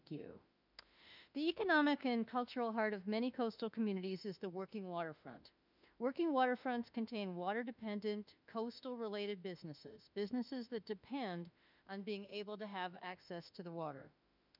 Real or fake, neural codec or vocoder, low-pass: fake; autoencoder, 48 kHz, 32 numbers a frame, DAC-VAE, trained on Japanese speech; 5.4 kHz